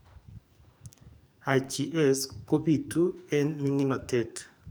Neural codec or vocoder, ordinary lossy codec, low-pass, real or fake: codec, 44.1 kHz, 2.6 kbps, SNAC; none; none; fake